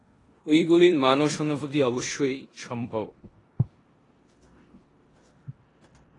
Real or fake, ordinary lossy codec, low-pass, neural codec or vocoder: fake; AAC, 32 kbps; 10.8 kHz; codec, 16 kHz in and 24 kHz out, 0.9 kbps, LongCat-Audio-Codec, four codebook decoder